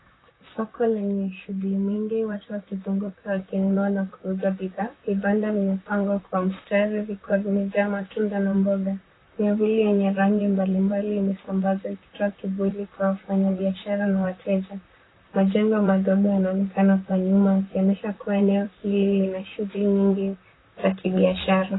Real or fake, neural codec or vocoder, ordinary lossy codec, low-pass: fake; vocoder, 44.1 kHz, 128 mel bands, Pupu-Vocoder; AAC, 16 kbps; 7.2 kHz